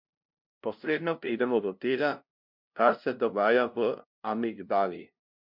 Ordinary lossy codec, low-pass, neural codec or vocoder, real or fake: MP3, 48 kbps; 5.4 kHz; codec, 16 kHz, 0.5 kbps, FunCodec, trained on LibriTTS, 25 frames a second; fake